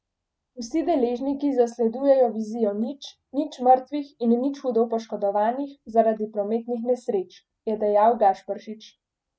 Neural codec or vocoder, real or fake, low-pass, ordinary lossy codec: none; real; none; none